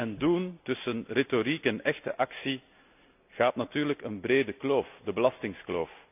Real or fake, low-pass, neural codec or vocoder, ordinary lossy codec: real; 3.6 kHz; none; none